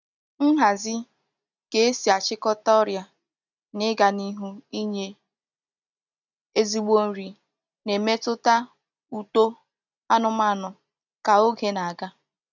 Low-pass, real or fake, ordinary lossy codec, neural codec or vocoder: 7.2 kHz; real; none; none